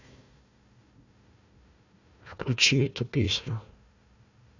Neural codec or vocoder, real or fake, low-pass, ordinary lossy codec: codec, 16 kHz, 1 kbps, FunCodec, trained on Chinese and English, 50 frames a second; fake; 7.2 kHz; none